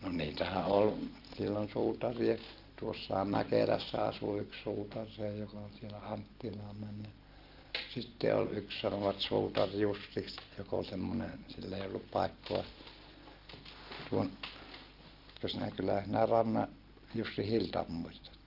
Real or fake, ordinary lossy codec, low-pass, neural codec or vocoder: real; Opus, 32 kbps; 5.4 kHz; none